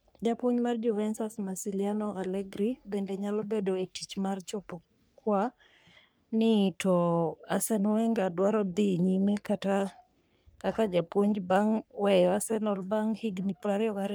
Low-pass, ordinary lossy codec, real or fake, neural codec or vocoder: none; none; fake; codec, 44.1 kHz, 3.4 kbps, Pupu-Codec